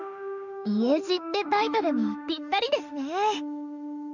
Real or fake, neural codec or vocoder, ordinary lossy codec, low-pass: fake; autoencoder, 48 kHz, 32 numbers a frame, DAC-VAE, trained on Japanese speech; none; 7.2 kHz